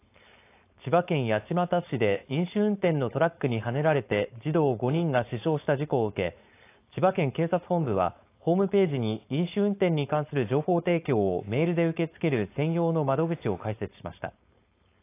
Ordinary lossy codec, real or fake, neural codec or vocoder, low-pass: AAC, 24 kbps; fake; codec, 16 kHz, 4.8 kbps, FACodec; 3.6 kHz